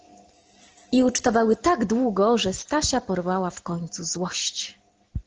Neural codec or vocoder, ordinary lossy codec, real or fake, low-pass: none; Opus, 16 kbps; real; 7.2 kHz